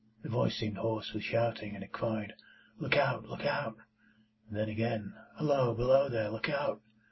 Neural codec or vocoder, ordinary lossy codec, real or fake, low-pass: none; MP3, 24 kbps; real; 7.2 kHz